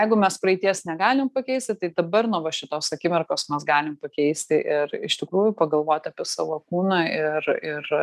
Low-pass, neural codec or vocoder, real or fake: 14.4 kHz; none; real